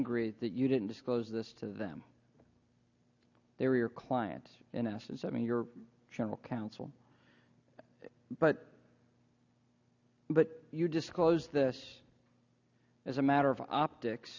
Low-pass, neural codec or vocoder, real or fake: 7.2 kHz; none; real